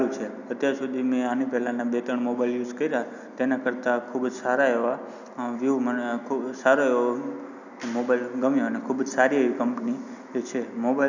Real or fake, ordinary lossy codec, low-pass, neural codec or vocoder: real; none; 7.2 kHz; none